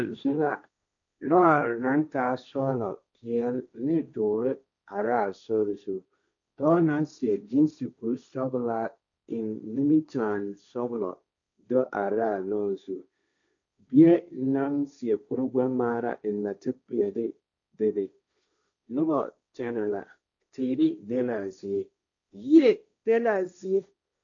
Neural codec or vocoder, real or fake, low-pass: codec, 16 kHz, 1.1 kbps, Voila-Tokenizer; fake; 7.2 kHz